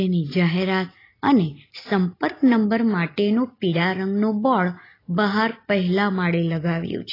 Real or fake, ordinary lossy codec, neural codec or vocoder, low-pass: real; AAC, 24 kbps; none; 5.4 kHz